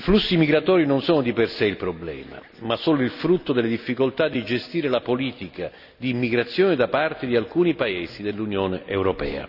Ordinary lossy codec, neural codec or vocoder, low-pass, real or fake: none; none; 5.4 kHz; real